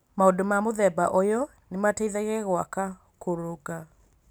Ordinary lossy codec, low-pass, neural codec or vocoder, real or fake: none; none; none; real